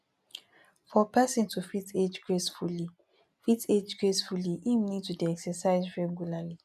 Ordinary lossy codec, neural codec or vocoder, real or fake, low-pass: none; none; real; 14.4 kHz